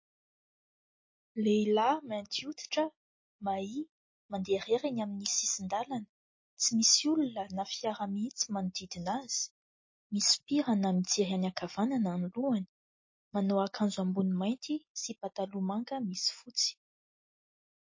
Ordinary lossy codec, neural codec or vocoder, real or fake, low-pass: MP3, 32 kbps; none; real; 7.2 kHz